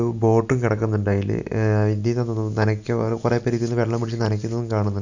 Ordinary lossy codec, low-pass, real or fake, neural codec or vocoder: none; 7.2 kHz; real; none